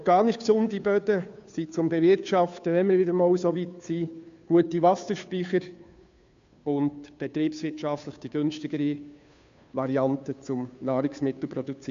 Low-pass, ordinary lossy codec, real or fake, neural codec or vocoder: 7.2 kHz; MP3, 64 kbps; fake; codec, 16 kHz, 2 kbps, FunCodec, trained on Chinese and English, 25 frames a second